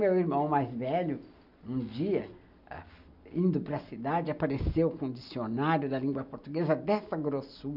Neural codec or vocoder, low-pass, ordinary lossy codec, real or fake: none; 5.4 kHz; none; real